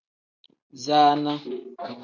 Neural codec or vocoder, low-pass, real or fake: none; 7.2 kHz; real